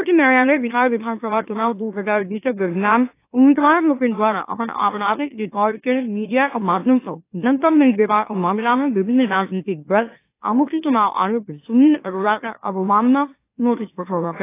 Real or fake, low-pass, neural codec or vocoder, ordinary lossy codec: fake; 3.6 kHz; autoencoder, 44.1 kHz, a latent of 192 numbers a frame, MeloTTS; AAC, 24 kbps